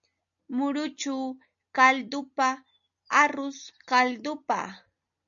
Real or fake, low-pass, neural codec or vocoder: real; 7.2 kHz; none